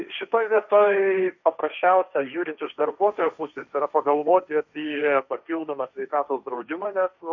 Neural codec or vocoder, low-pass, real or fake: codec, 16 kHz, 1.1 kbps, Voila-Tokenizer; 7.2 kHz; fake